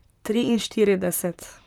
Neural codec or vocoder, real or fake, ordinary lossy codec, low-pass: vocoder, 44.1 kHz, 128 mel bands, Pupu-Vocoder; fake; none; 19.8 kHz